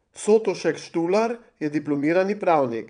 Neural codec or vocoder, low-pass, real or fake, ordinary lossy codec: vocoder, 22.05 kHz, 80 mel bands, WaveNeXt; 9.9 kHz; fake; none